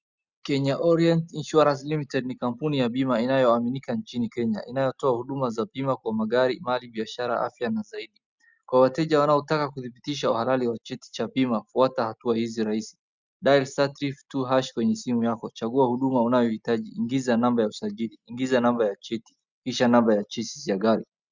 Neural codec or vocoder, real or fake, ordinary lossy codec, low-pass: none; real; Opus, 64 kbps; 7.2 kHz